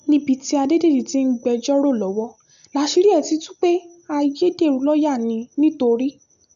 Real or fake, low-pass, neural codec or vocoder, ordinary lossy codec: real; 7.2 kHz; none; none